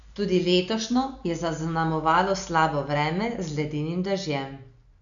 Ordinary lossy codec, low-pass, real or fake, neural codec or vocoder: none; 7.2 kHz; real; none